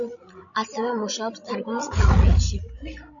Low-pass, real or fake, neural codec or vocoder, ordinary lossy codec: 7.2 kHz; fake; codec, 16 kHz, 16 kbps, FreqCodec, larger model; MP3, 96 kbps